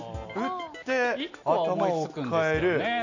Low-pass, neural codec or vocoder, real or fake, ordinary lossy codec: 7.2 kHz; none; real; none